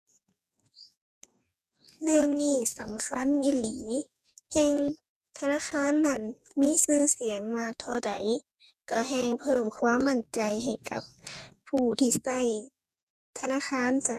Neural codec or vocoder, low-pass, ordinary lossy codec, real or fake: codec, 44.1 kHz, 2.6 kbps, DAC; 14.4 kHz; none; fake